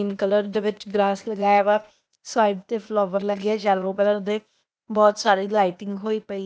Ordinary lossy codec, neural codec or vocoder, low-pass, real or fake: none; codec, 16 kHz, 0.8 kbps, ZipCodec; none; fake